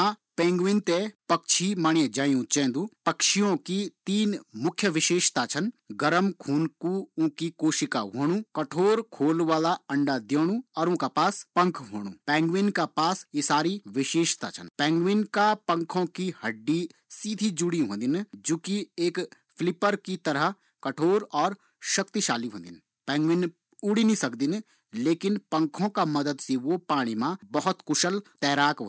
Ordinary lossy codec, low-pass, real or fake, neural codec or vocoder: none; none; real; none